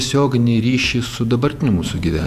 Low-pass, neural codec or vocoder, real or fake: 14.4 kHz; vocoder, 48 kHz, 128 mel bands, Vocos; fake